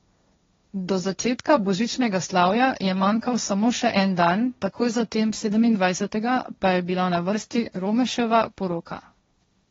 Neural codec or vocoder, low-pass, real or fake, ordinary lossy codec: codec, 16 kHz, 1.1 kbps, Voila-Tokenizer; 7.2 kHz; fake; AAC, 24 kbps